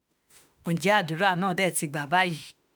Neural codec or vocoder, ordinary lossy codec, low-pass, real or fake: autoencoder, 48 kHz, 32 numbers a frame, DAC-VAE, trained on Japanese speech; none; none; fake